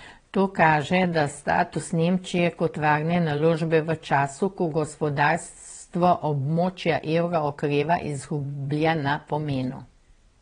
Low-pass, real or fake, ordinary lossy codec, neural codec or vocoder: 9.9 kHz; fake; AAC, 32 kbps; vocoder, 22.05 kHz, 80 mel bands, WaveNeXt